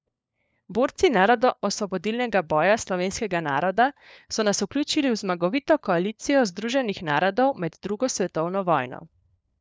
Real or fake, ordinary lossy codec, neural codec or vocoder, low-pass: fake; none; codec, 16 kHz, 4 kbps, FunCodec, trained on LibriTTS, 50 frames a second; none